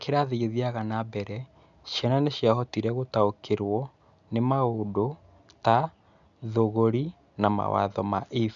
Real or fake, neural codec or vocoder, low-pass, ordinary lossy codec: real; none; 7.2 kHz; none